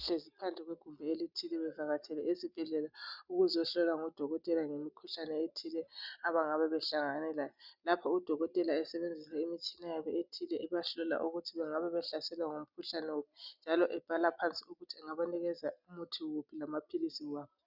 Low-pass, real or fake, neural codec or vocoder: 5.4 kHz; real; none